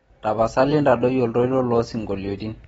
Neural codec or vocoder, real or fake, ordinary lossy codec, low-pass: none; real; AAC, 24 kbps; 10.8 kHz